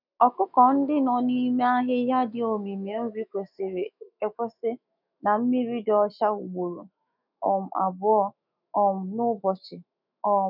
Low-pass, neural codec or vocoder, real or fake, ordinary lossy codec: 5.4 kHz; autoencoder, 48 kHz, 128 numbers a frame, DAC-VAE, trained on Japanese speech; fake; none